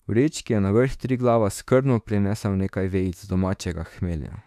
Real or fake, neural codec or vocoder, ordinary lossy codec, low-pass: fake; autoencoder, 48 kHz, 32 numbers a frame, DAC-VAE, trained on Japanese speech; none; 14.4 kHz